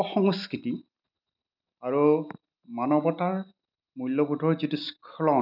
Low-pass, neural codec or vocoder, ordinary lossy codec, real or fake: 5.4 kHz; none; none; real